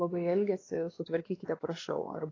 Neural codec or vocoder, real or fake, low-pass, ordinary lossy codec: codec, 16 kHz, 2 kbps, X-Codec, WavLM features, trained on Multilingual LibriSpeech; fake; 7.2 kHz; AAC, 32 kbps